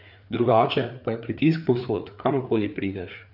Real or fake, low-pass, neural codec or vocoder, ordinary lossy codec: fake; 5.4 kHz; codec, 16 kHz, 4 kbps, FreqCodec, larger model; none